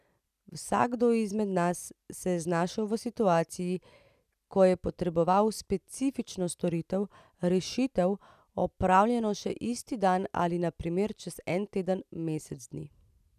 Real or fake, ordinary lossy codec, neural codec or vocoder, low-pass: real; none; none; 14.4 kHz